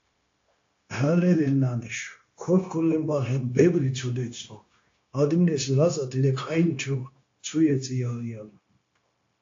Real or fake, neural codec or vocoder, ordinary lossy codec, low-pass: fake; codec, 16 kHz, 0.9 kbps, LongCat-Audio-Codec; AAC, 48 kbps; 7.2 kHz